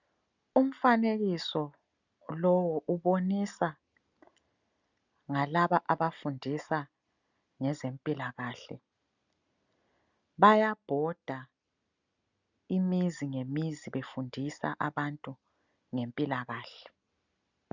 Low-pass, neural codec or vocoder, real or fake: 7.2 kHz; none; real